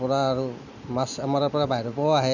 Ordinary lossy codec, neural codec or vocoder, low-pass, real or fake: none; none; 7.2 kHz; real